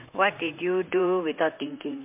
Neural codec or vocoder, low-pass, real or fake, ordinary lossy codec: vocoder, 44.1 kHz, 128 mel bands, Pupu-Vocoder; 3.6 kHz; fake; MP3, 32 kbps